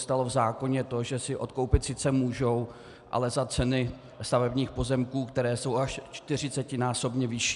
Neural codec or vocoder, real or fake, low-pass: none; real; 10.8 kHz